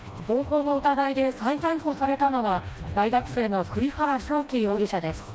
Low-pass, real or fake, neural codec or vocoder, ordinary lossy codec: none; fake; codec, 16 kHz, 1 kbps, FreqCodec, smaller model; none